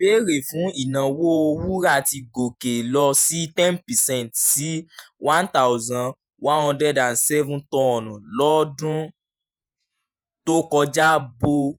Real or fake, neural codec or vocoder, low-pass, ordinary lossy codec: fake; vocoder, 48 kHz, 128 mel bands, Vocos; none; none